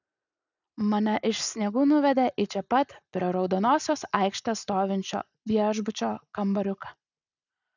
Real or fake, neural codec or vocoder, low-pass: real; none; 7.2 kHz